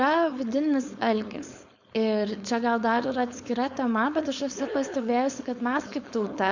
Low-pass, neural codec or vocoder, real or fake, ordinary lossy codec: 7.2 kHz; codec, 16 kHz, 4.8 kbps, FACodec; fake; Opus, 64 kbps